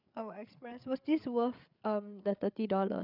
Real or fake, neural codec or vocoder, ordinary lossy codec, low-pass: fake; codec, 16 kHz, 8 kbps, FreqCodec, larger model; none; 5.4 kHz